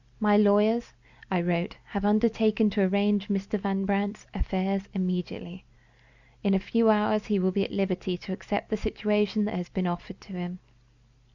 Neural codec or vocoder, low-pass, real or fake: none; 7.2 kHz; real